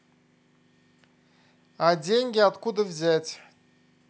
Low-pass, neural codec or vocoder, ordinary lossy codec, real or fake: none; none; none; real